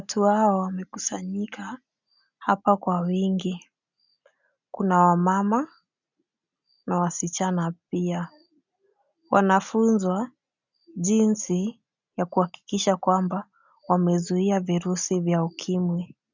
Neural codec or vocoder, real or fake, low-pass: none; real; 7.2 kHz